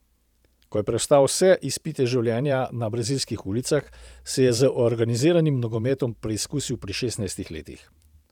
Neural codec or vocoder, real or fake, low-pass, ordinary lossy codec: vocoder, 44.1 kHz, 128 mel bands every 512 samples, BigVGAN v2; fake; 19.8 kHz; none